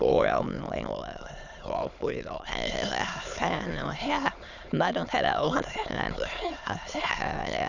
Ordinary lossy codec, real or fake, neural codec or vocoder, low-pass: none; fake; autoencoder, 22.05 kHz, a latent of 192 numbers a frame, VITS, trained on many speakers; 7.2 kHz